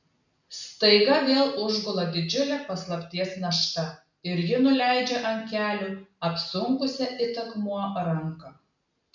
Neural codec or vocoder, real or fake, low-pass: none; real; 7.2 kHz